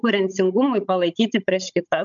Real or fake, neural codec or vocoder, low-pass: fake; codec, 16 kHz, 16 kbps, FunCodec, trained on Chinese and English, 50 frames a second; 7.2 kHz